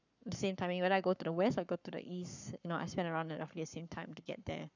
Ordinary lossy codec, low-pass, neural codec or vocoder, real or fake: none; 7.2 kHz; codec, 44.1 kHz, 7.8 kbps, Pupu-Codec; fake